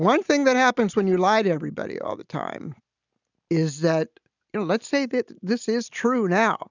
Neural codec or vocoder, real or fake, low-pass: none; real; 7.2 kHz